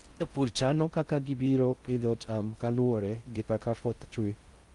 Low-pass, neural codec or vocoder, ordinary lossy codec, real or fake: 10.8 kHz; codec, 16 kHz in and 24 kHz out, 0.6 kbps, FocalCodec, streaming, 4096 codes; Opus, 32 kbps; fake